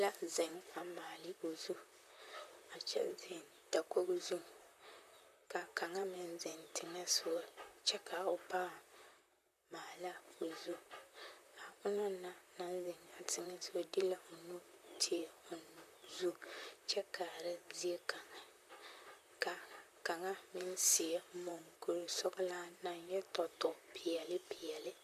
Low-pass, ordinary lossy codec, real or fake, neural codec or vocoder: 14.4 kHz; MP3, 96 kbps; fake; vocoder, 44.1 kHz, 128 mel bands, Pupu-Vocoder